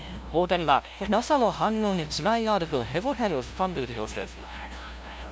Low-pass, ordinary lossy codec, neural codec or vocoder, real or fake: none; none; codec, 16 kHz, 0.5 kbps, FunCodec, trained on LibriTTS, 25 frames a second; fake